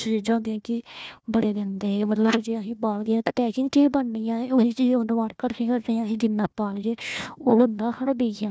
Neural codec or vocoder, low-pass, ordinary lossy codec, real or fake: codec, 16 kHz, 1 kbps, FunCodec, trained on Chinese and English, 50 frames a second; none; none; fake